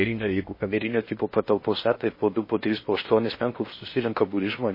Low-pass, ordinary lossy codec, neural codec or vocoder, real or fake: 5.4 kHz; MP3, 24 kbps; codec, 16 kHz in and 24 kHz out, 0.6 kbps, FocalCodec, streaming, 4096 codes; fake